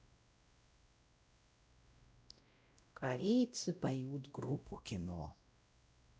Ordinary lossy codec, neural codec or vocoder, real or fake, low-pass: none; codec, 16 kHz, 0.5 kbps, X-Codec, WavLM features, trained on Multilingual LibriSpeech; fake; none